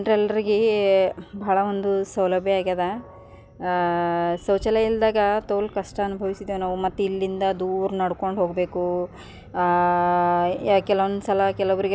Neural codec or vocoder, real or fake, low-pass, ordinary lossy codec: none; real; none; none